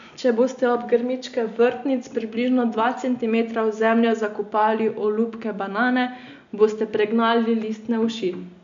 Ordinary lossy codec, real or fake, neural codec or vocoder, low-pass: AAC, 64 kbps; real; none; 7.2 kHz